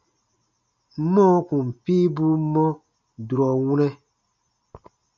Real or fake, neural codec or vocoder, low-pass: real; none; 7.2 kHz